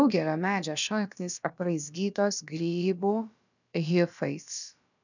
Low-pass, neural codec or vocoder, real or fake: 7.2 kHz; codec, 16 kHz, about 1 kbps, DyCAST, with the encoder's durations; fake